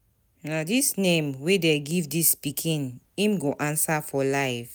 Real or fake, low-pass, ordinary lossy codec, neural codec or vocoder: real; none; none; none